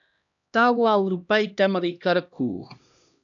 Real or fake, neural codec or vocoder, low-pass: fake; codec, 16 kHz, 1 kbps, X-Codec, HuBERT features, trained on LibriSpeech; 7.2 kHz